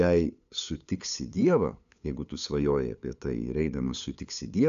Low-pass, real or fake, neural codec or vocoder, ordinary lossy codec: 7.2 kHz; fake; codec, 16 kHz, 8 kbps, FunCodec, trained on LibriTTS, 25 frames a second; AAC, 96 kbps